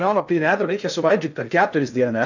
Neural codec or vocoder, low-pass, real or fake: codec, 16 kHz in and 24 kHz out, 0.6 kbps, FocalCodec, streaming, 2048 codes; 7.2 kHz; fake